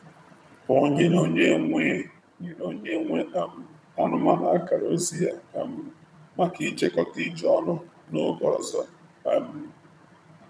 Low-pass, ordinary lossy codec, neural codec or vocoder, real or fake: none; none; vocoder, 22.05 kHz, 80 mel bands, HiFi-GAN; fake